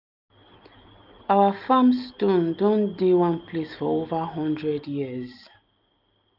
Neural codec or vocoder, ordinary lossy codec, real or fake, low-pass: none; none; real; 5.4 kHz